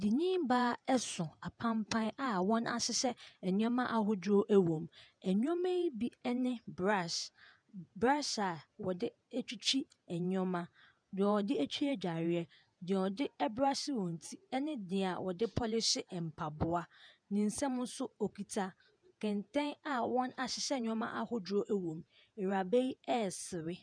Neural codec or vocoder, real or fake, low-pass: vocoder, 48 kHz, 128 mel bands, Vocos; fake; 9.9 kHz